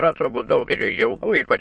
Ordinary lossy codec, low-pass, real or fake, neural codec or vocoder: MP3, 48 kbps; 9.9 kHz; fake; autoencoder, 22.05 kHz, a latent of 192 numbers a frame, VITS, trained on many speakers